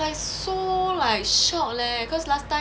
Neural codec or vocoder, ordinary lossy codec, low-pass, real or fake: none; none; none; real